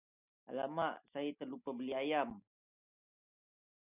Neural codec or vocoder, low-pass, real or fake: none; 3.6 kHz; real